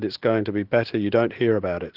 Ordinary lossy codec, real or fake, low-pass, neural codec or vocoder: Opus, 16 kbps; real; 5.4 kHz; none